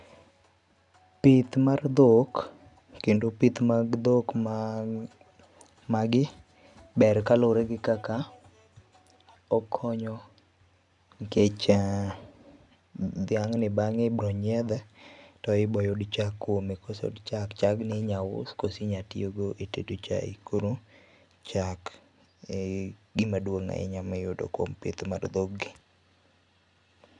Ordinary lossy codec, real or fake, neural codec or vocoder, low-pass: none; real; none; 10.8 kHz